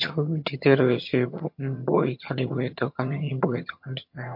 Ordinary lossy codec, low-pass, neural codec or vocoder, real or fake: MP3, 32 kbps; 5.4 kHz; vocoder, 22.05 kHz, 80 mel bands, HiFi-GAN; fake